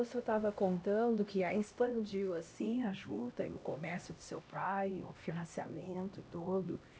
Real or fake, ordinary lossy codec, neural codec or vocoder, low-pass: fake; none; codec, 16 kHz, 1 kbps, X-Codec, HuBERT features, trained on LibriSpeech; none